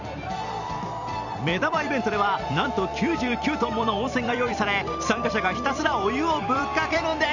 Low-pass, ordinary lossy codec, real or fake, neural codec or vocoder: 7.2 kHz; none; fake; vocoder, 44.1 kHz, 128 mel bands every 256 samples, BigVGAN v2